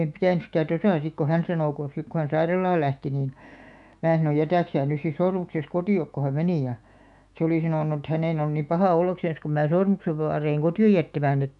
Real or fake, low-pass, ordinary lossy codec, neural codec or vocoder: fake; 10.8 kHz; none; autoencoder, 48 kHz, 128 numbers a frame, DAC-VAE, trained on Japanese speech